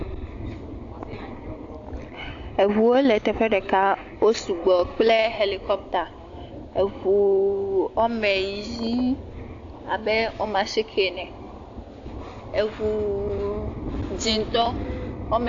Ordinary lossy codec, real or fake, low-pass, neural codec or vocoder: AAC, 48 kbps; real; 7.2 kHz; none